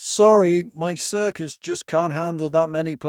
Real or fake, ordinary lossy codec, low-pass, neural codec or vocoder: fake; none; 19.8 kHz; codec, 44.1 kHz, 2.6 kbps, DAC